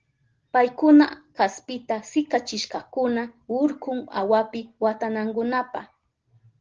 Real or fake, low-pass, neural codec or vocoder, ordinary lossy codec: real; 7.2 kHz; none; Opus, 16 kbps